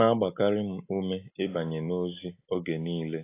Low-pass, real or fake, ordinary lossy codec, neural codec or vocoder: 3.6 kHz; real; AAC, 24 kbps; none